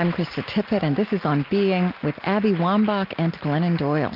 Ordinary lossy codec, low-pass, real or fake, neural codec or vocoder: Opus, 24 kbps; 5.4 kHz; real; none